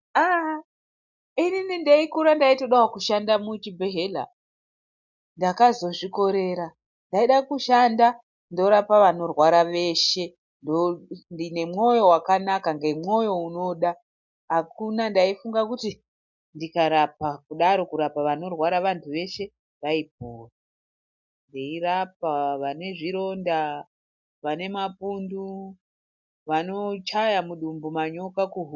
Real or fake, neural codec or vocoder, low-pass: real; none; 7.2 kHz